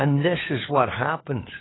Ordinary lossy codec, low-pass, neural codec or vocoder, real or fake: AAC, 16 kbps; 7.2 kHz; codec, 16 kHz, 4 kbps, FunCodec, trained on LibriTTS, 50 frames a second; fake